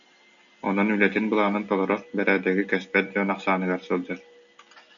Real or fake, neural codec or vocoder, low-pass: real; none; 7.2 kHz